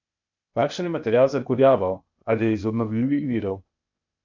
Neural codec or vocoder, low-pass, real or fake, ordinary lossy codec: codec, 16 kHz, 0.8 kbps, ZipCodec; 7.2 kHz; fake; none